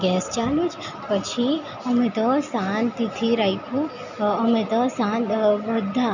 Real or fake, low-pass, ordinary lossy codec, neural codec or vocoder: real; 7.2 kHz; none; none